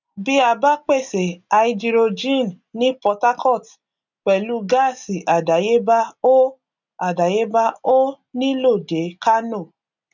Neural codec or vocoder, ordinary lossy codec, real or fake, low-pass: none; none; real; 7.2 kHz